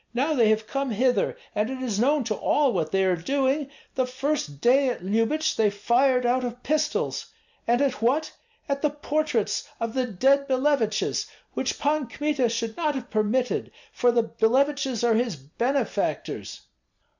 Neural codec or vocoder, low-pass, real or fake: none; 7.2 kHz; real